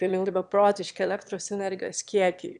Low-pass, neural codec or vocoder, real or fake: 9.9 kHz; autoencoder, 22.05 kHz, a latent of 192 numbers a frame, VITS, trained on one speaker; fake